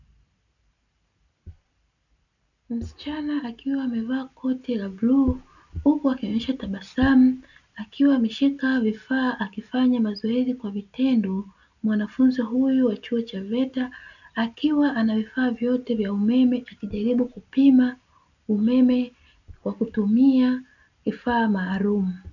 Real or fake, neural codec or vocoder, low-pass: real; none; 7.2 kHz